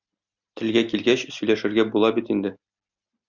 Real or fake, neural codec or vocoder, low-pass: real; none; 7.2 kHz